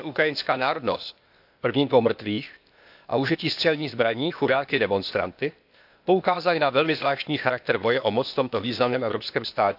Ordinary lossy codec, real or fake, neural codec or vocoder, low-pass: AAC, 48 kbps; fake; codec, 16 kHz, 0.8 kbps, ZipCodec; 5.4 kHz